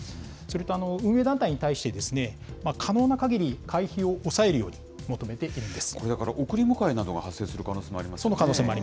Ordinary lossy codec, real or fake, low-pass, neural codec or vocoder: none; real; none; none